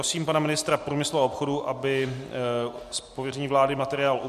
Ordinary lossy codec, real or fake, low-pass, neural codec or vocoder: MP3, 64 kbps; real; 14.4 kHz; none